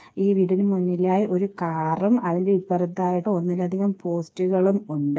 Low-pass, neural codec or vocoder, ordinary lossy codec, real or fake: none; codec, 16 kHz, 4 kbps, FreqCodec, smaller model; none; fake